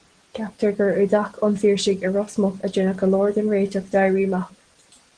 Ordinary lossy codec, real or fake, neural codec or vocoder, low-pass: Opus, 16 kbps; real; none; 9.9 kHz